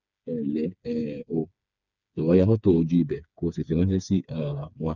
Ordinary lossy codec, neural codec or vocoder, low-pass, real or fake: none; codec, 16 kHz, 4 kbps, FreqCodec, smaller model; 7.2 kHz; fake